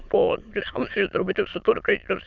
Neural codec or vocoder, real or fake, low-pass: autoencoder, 22.05 kHz, a latent of 192 numbers a frame, VITS, trained on many speakers; fake; 7.2 kHz